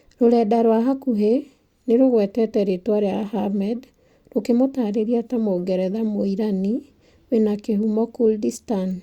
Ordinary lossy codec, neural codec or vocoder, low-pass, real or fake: Opus, 64 kbps; none; 19.8 kHz; real